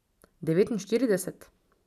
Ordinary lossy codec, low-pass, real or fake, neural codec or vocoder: none; 14.4 kHz; real; none